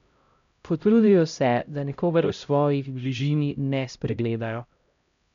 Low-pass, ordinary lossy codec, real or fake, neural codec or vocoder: 7.2 kHz; MP3, 64 kbps; fake; codec, 16 kHz, 0.5 kbps, X-Codec, HuBERT features, trained on LibriSpeech